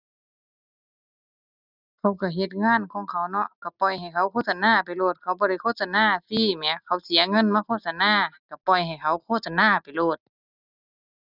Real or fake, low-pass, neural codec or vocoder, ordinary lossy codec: real; 5.4 kHz; none; none